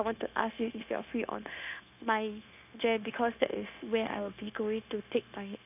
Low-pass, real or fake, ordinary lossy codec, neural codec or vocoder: 3.6 kHz; fake; none; codec, 16 kHz, 0.9 kbps, LongCat-Audio-Codec